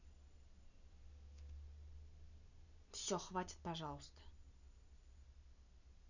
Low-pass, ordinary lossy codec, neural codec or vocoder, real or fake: 7.2 kHz; none; none; real